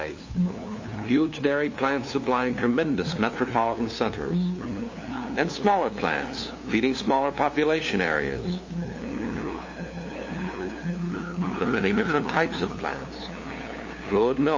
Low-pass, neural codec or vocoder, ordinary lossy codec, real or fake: 7.2 kHz; codec, 16 kHz, 2 kbps, FunCodec, trained on LibriTTS, 25 frames a second; MP3, 32 kbps; fake